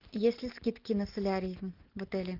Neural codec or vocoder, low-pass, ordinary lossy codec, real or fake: none; 5.4 kHz; Opus, 24 kbps; real